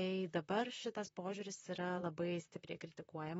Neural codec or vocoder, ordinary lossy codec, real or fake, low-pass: vocoder, 44.1 kHz, 128 mel bands, Pupu-Vocoder; AAC, 24 kbps; fake; 19.8 kHz